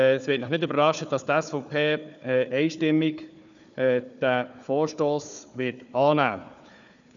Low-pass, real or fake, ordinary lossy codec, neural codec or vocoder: 7.2 kHz; fake; none; codec, 16 kHz, 4 kbps, FunCodec, trained on Chinese and English, 50 frames a second